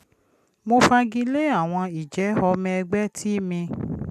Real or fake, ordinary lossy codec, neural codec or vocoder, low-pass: real; none; none; 14.4 kHz